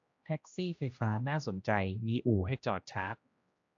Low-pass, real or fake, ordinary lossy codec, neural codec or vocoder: 7.2 kHz; fake; AAC, 48 kbps; codec, 16 kHz, 1 kbps, X-Codec, HuBERT features, trained on general audio